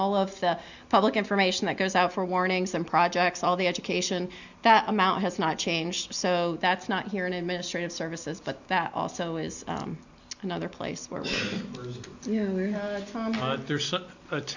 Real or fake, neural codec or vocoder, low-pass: real; none; 7.2 kHz